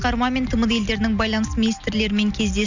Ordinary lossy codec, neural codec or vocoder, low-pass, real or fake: none; none; 7.2 kHz; real